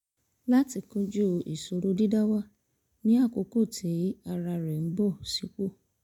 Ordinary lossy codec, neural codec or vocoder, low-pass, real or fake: none; none; 19.8 kHz; real